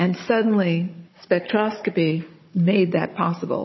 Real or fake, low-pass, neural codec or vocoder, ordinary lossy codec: fake; 7.2 kHz; codec, 16 kHz, 16 kbps, FunCodec, trained on Chinese and English, 50 frames a second; MP3, 24 kbps